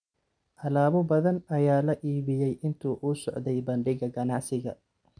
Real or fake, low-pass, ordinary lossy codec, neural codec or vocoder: real; 9.9 kHz; none; none